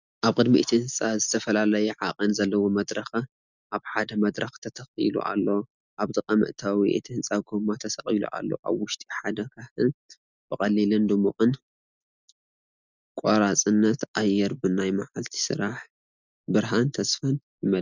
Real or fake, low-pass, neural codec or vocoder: real; 7.2 kHz; none